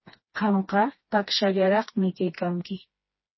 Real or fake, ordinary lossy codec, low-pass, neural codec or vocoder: fake; MP3, 24 kbps; 7.2 kHz; codec, 16 kHz, 2 kbps, FreqCodec, smaller model